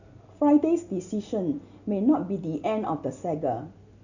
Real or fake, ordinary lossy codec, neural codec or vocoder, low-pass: real; none; none; 7.2 kHz